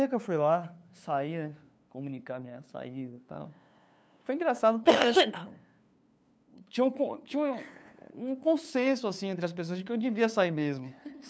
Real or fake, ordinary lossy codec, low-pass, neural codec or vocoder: fake; none; none; codec, 16 kHz, 2 kbps, FunCodec, trained on LibriTTS, 25 frames a second